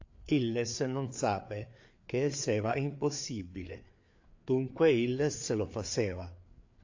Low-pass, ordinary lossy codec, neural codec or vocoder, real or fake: 7.2 kHz; AAC, 48 kbps; codec, 16 kHz, 4 kbps, FreqCodec, larger model; fake